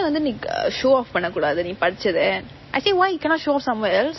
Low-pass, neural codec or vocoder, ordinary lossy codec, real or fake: 7.2 kHz; none; MP3, 24 kbps; real